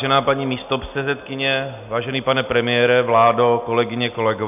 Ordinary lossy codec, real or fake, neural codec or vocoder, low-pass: AAC, 32 kbps; real; none; 3.6 kHz